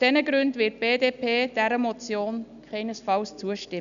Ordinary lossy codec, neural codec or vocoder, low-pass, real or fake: none; none; 7.2 kHz; real